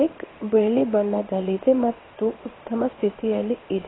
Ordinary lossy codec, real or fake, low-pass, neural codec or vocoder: AAC, 16 kbps; fake; 7.2 kHz; codec, 16 kHz in and 24 kHz out, 1 kbps, XY-Tokenizer